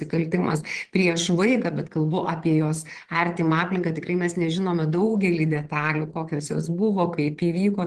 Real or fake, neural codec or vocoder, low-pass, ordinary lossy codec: fake; vocoder, 22.05 kHz, 80 mel bands, Vocos; 9.9 kHz; Opus, 16 kbps